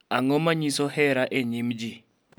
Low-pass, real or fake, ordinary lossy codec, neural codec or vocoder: none; real; none; none